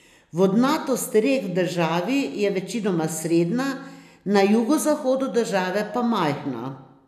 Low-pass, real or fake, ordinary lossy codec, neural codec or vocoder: 14.4 kHz; real; none; none